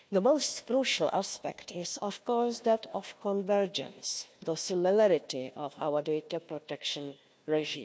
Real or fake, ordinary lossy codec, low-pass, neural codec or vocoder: fake; none; none; codec, 16 kHz, 1 kbps, FunCodec, trained on Chinese and English, 50 frames a second